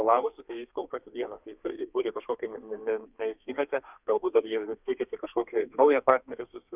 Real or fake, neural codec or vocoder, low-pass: fake; codec, 32 kHz, 1.9 kbps, SNAC; 3.6 kHz